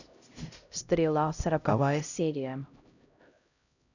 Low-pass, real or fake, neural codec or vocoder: 7.2 kHz; fake; codec, 16 kHz, 0.5 kbps, X-Codec, HuBERT features, trained on LibriSpeech